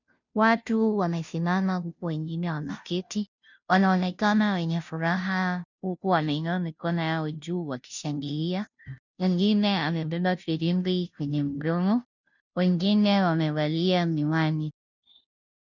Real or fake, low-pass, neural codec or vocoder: fake; 7.2 kHz; codec, 16 kHz, 0.5 kbps, FunCodec, trained on Chinese and English, 25 frames a second